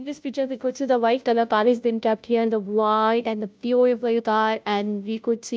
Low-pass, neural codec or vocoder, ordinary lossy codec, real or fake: none; codec, 16 kHz, 0.5 kbps, FunCodec, trained on Chinese and English, 25 frames a second; none; fake